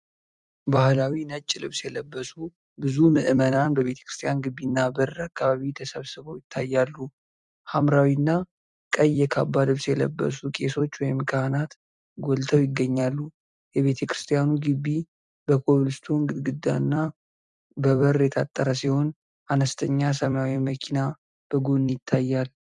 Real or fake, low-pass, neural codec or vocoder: fake; 10.8 kHz; vocoder, 44.1 kHz, 128 mel bands every 256 samples, BigVGAN v2